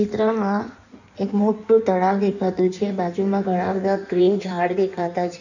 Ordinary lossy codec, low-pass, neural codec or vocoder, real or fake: none; 7.2 kHz; codec, 16 kHz in and 24 kHz out, 1.1 kbps, FireRedTTS-2 codec; fake